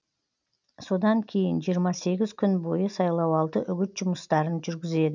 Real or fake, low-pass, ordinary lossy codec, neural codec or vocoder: real; 7.2 kHz; none; none